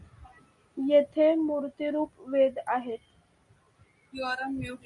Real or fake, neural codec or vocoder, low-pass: real; none; 10.8 kHz